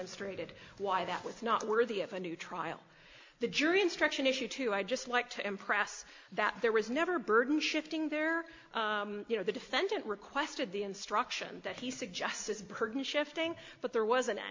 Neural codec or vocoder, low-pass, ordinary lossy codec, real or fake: none; 7.2 kHz; MP3, 48 kbps; real